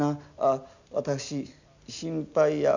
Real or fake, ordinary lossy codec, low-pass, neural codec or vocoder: real; none; 7.2 kHz; none